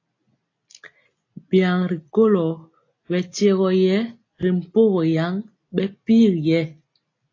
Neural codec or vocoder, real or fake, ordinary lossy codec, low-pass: none; real; AAC, 32 kbps; 7.2 kHz